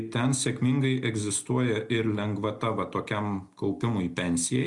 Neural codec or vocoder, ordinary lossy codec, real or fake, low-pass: none; Opus, 64 kbps; real; 10.8 kHz